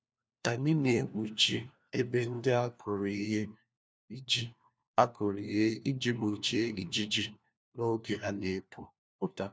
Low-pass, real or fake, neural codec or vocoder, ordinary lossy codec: none; fake; codec, 16 kHz, 1 kbps, FunCodec, trained on LibriTTS, 50 frames a second; none